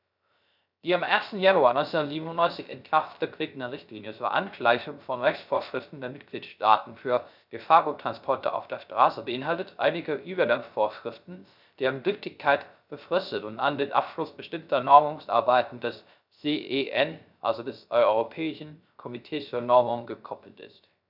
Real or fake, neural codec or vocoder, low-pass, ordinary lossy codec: fake; codec, 16 kHz, 0.3 kbps, FocalCodec; 5.4 kHz; none